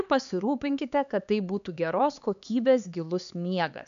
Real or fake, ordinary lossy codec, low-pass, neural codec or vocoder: fake; MP3, 96 kbps; 7.2 kHz; codec, 16 kHz, 4 kbps, X-Codec, HuBERT features, trained on LibriSpeech